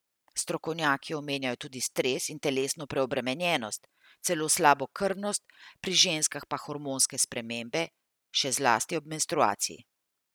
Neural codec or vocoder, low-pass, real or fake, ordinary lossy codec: none; none; real; none